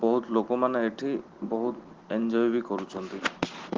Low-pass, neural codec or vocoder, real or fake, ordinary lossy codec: 7.2 kHz; none; real; Opus, 16 kbps